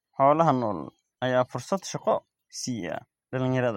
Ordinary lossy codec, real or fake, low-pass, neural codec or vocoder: MP3, 64 kbps; real; 19.8 kHz; none